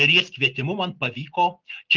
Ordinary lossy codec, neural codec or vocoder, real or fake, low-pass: Opus, 32 kbps; none; real; 7.2 kHz